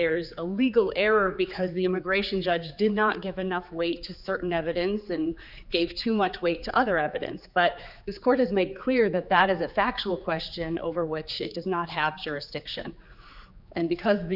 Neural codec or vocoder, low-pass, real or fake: codec, 16 kHz, 4 kbps, X-Codec, HuBERT features, trained on general audio; 5.4 kHz; fake